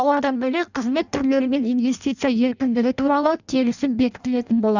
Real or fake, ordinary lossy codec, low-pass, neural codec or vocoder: fake; none; 7.2 kHz; codec, 16 kHz in and 24 kHz out, 0.6 kbps, FireRedTTS-2 codec